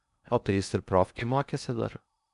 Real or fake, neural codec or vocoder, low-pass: fake; codec, 16 kHz in and 24 kHz out, 0.6 kbps, FocalCodec, streaming, 2048 codes; 10.8 kHz